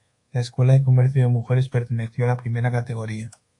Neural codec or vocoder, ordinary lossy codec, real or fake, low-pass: codec, 24 kHz, 1.2 kbps, DualCodec; AAC, 48 kbps; fake; 10.8 kHz